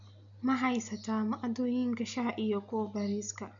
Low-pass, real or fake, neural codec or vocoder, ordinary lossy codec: 7.2 kHz; real; none; none